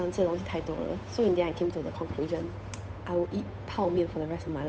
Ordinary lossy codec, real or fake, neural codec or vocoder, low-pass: none; fake; codec, 16 kHz, 8 kbps, FunCodec, trained on Chinese and English, 25 frames a second; none